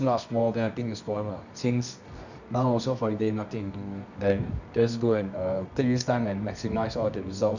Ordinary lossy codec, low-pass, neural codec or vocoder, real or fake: none; 7.2 kHz; codec, 24 kHz, 0.9 kbps, WavTokenizer, medium music audio release; fake